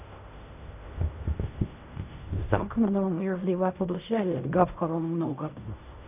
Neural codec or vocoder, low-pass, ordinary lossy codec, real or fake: codec, 16 kHz in and 24 kHz out, 0.4 kbps, LongCat-Audio-Codec, fine tuned four codebook decoder; 3.6 kHz; none; fake